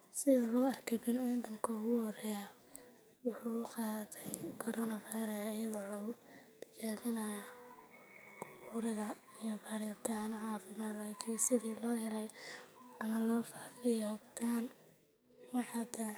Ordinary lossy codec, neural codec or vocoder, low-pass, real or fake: none; codec, 44.1 kHz, 2.6 kbps, SNAC; none; fake